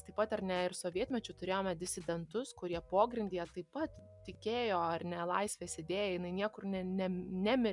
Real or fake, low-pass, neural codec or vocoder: real; 10.8 kHz; none